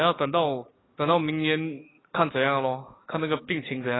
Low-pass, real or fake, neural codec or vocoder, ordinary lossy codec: 7.2 kHz; fake; codec, 44.1 kHz, 7.8 kbps, DAC; AAC, 16 kbps